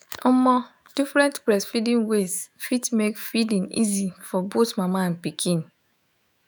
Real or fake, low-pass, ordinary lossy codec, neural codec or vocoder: fake; none; none; autoencoder, 48 kHz, 128 numbers a frame, DAC-VAE, trained on Japanese speech